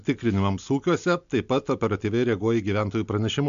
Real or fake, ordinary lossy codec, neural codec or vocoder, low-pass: real; MP3, 64 kbps; none; 7.2 kHz